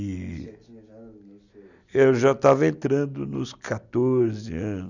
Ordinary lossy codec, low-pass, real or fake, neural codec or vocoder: none; 7.2 kHz; real; none